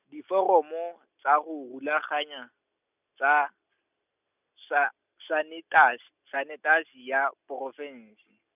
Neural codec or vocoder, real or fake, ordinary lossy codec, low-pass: none; real; none; 3.6 kHz